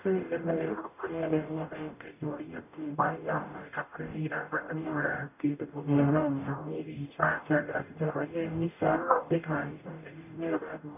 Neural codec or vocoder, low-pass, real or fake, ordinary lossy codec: codec, 44.1 kHz, 0.9 kbps, DAC; 3.6 kHz; fake; none